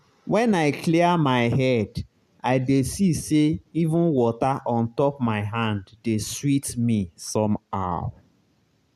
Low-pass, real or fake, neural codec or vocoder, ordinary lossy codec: 14.4 kHz; real; none; none